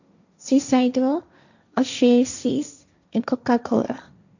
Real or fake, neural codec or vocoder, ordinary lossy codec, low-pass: fake; codec, 16 kHz, 1.1 kbps, Voila-Tokenizer; none; 7.2 kHz